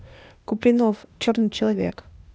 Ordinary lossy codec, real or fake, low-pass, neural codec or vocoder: none; fake; none; codec, 16 kHz, 0.8 kbps, ZipCodec